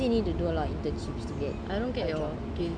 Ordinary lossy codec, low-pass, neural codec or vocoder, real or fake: none; 9.9 kHz; none; real